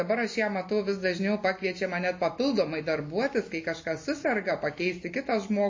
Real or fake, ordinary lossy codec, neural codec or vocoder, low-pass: real; MP3, 32 kbps; none; 7.2 kHz